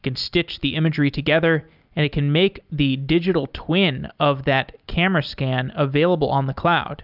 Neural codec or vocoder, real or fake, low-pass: none; real; 5.4 kHz